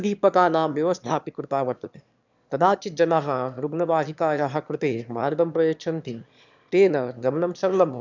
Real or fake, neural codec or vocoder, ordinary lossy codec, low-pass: fake; autoencoder, 22.05 kHz, a latent of 192 numbers a frame, VITS, trained on one speaker; none; 7.2 kHz